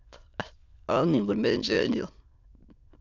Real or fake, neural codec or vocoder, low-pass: fake; autoencoder, 22.05 kHz, a latent of 192 numbers a frame, VITS, trained on many speakers; 7.2 kHz